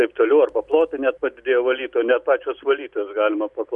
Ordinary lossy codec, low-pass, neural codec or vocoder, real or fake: MP3, 96 kbps; 10.8 kHz; none; real